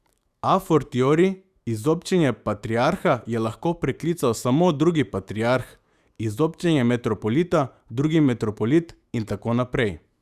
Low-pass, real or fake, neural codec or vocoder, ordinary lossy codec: 14.4 kHz; fake; autoencoder, 48 kHz, 128 numbers a frame, DAC-VAE, trained on Japanese speech; Opus, 64 kbps